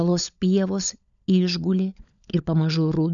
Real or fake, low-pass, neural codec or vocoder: fake; 7.2 kHz; codec, 16 kHz, 16 kbps, FunCodec, trained on LibriTTS, 50 frames a second